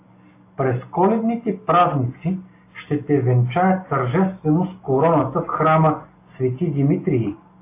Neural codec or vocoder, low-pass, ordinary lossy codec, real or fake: none; 3.6 kHz; AAC, 24 kbps; real